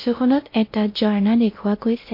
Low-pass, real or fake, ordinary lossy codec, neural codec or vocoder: 5.4 kHz; fake; MP3, 32 kbps; codec, 16 kHz in and 24 kHz out, 0.6 kbps, FocalCodec, streaming, 2048 codes